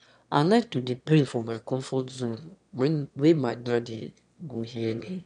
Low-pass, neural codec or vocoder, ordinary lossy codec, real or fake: 9.9 kHz; autoencoder, 22.05 kHz, a latent of 192 numbers a frame, VITS, trained on one speaker; none; fake